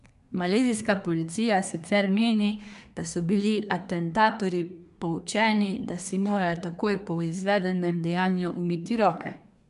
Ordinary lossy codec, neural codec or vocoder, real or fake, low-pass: none; codec, 24 kHz, 1 kbps, SNAC; fake; 10.8 kHz